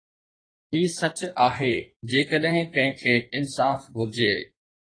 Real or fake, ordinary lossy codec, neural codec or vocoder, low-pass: fake; AAC, 32 kbps; codec, 16 kHz in and 24 kHz out, 1.1 kbps, FireRedTTS-2 codec; 9.9 kHz